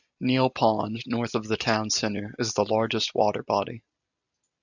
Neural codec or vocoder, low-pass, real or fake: none; 7.2 kHz; real